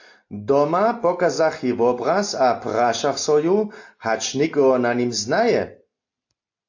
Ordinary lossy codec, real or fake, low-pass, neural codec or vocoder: AAC, 48 kbps; real; 7.2 kHz; none